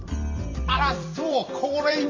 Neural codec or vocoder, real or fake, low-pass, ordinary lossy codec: vocoder, 44.1 kHz, 128 mel bands every 256 samples, BigVGAN v2; fake; 7.2 kHz; MP3, 48 kbps